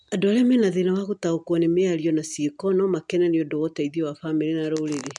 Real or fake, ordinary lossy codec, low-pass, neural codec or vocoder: real; MP3, 96 kbps; 10.8 kHz; none